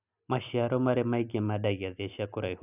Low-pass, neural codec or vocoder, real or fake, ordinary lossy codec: 3.6 kHz; none; real; none